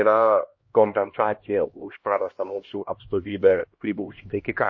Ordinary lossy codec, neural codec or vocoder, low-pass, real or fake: MP3, 32 kbps; codec, 16 kHz, 1 kbps, X-Codec, HuBERT features, trained on LibriSpeech; 7.2 kHz; fake